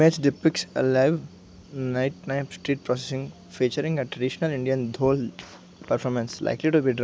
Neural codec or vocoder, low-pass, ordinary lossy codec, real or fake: none; none; none; real